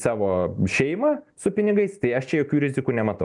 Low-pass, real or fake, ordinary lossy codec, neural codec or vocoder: 10.8 kHz; real; MP3, 96 kbps; none